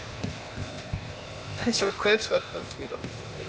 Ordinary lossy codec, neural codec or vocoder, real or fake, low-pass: none; codec, 16 kHz, 0.8 kbps, ZipCodec; fake; none